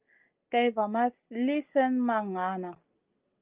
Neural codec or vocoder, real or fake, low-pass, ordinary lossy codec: none; real; 3.6 kHz; Opus, 24 kbps